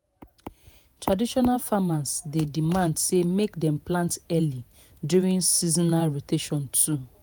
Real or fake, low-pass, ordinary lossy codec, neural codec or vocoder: fake; none; none; vocoder, 48 kHz, 128 mel bands, Vocos